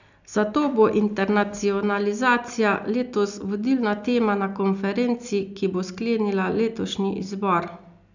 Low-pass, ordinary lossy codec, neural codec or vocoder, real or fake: 7.2 kHz; none; none; real